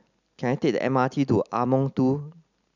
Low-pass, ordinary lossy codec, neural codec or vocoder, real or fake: 7.2 kHz; none; none; real